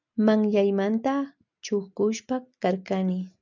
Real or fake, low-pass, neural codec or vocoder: real; 7.2 kHz; none